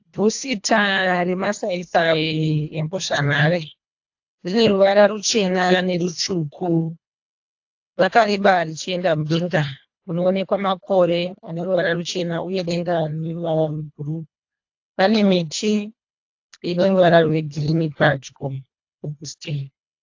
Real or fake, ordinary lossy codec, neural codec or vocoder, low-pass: fake; AAC, 48 kbps; codec, 24 kHz, 1.5 kbps, HILCodec; 7.2 kHz